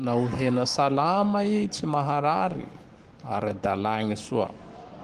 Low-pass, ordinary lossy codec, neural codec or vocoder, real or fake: 14.4 kHz; Opus, 24 kbps; codec, 44.1 kHz, 7.8 kbps, Pupu-Codec; fake